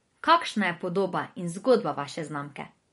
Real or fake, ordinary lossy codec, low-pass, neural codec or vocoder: fake; MP3, 48 kbps; 10.8 kHz; vocoder, 24 kHz, 100 mel bands, Vocos